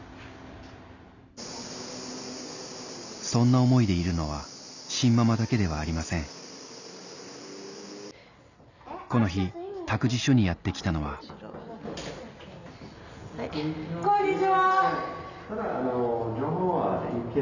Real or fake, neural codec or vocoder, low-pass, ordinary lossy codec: real; none; 7.2 kHz; none